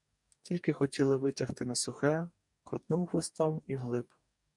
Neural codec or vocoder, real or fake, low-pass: codec, 44.1 kHz, 2.6 kbps, DAC; fake; 10.8 kHz